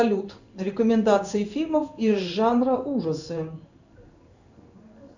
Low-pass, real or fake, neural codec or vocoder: 7.2 kHz; fake; codec, 16 kHz in and 24 kHz out, 1 kbps, XY-Tokenizer